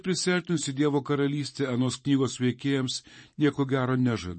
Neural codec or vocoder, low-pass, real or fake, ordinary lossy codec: vocoder, 44.1 kHz, 128 mel bands every 512 samples, BigVGAN v2; 10.8 kHz; fake; MP3, 32 kbps